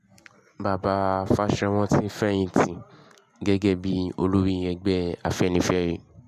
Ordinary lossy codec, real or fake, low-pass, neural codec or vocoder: MP3, 96 kbps; real; 14.4 kHz; none